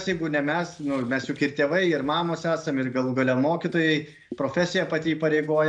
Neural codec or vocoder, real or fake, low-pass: none; real; 9.9 kHz